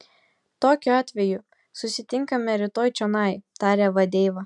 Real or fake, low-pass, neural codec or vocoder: real; 10.8 kHz; none